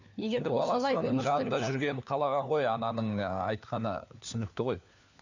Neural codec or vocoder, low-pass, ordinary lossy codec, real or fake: codec, 16 kHz, 4 kbps, FunCodec, trained on LibriTTS, 50 frames a second; 7.2 kHz; none; fake